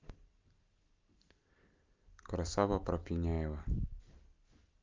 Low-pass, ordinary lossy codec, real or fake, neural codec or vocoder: 7.2 kHz; Opus, 32 kbps; real; none